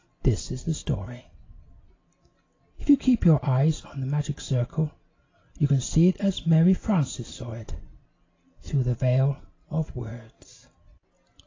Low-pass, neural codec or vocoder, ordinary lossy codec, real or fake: 7.2 kHz; none; AAC, 32 kbps; real